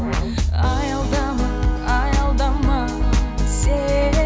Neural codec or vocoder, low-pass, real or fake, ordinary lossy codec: none; none; real; none